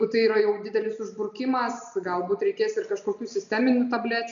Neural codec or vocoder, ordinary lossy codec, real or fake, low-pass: none; MP3, 96 kbps; real; 7.2 kHz